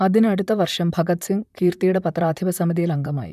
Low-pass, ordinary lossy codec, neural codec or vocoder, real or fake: 14.4 kHz; none; vocoder, 44.1 kHz, 128 mel bands, Pupu-Vocoder; fake